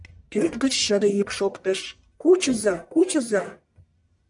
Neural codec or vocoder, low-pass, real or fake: codec, 44.1 kHz, 1.7 kbps, Pupu-Codec; 10.8 kHz; fake